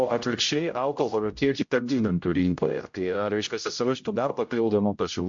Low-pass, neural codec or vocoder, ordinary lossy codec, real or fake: 7.2 kHz; codec, 16 kHz, 0.5 kbps, X-Codec, HuBERT features, trained on general audio; MP3, 48 kbps; fake